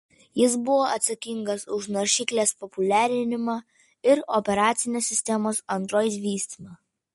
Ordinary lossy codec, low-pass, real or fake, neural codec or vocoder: MP3, 48 kbps; 19.8 kHz; real; none